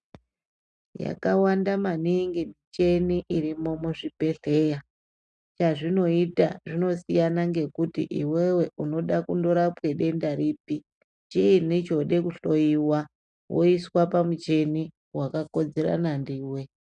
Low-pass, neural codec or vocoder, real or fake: 9.9 kHz; none; real